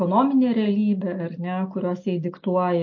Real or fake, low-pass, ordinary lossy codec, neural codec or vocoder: real; 7.2 kHz; MP3, 32 kbps; none